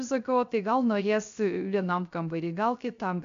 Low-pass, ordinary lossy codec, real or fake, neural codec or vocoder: 7.2 kHz; MP3, 64 kbps; fake; codec, 16 kHz, 0.3 kbps, FocalCodec